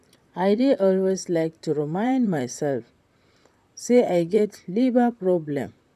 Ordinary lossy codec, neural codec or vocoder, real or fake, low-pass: none; vocoder, 44.1 kHz, 128 mel bands, Pupu-Vocoder; fake; 14.4 kHz